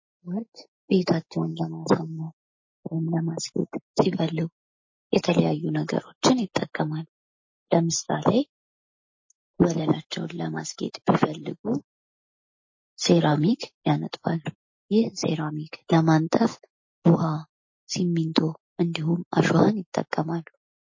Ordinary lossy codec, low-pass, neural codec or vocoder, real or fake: MP3, 32 kbps; 7.2 kHz; none; real